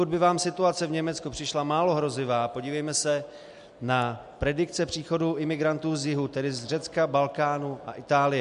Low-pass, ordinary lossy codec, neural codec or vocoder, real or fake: 10.8 kHz; MP3, 64 kbps; none; real